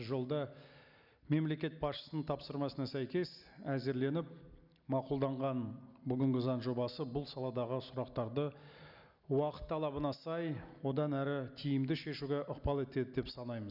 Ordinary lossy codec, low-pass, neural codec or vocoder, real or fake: none; 5.4 kHz; none; real